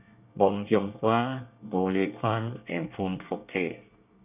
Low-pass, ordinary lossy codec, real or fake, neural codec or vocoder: 3.6 kHz; none; fake; codec, 24 kHz, 1 kbps, SNAC